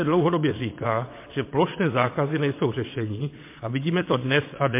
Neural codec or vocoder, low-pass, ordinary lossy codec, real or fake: vocoder, 22.05 kHz, 80 mel bands, WaveNeXt; 3.6 kHz; MP3, 24 kbps; fake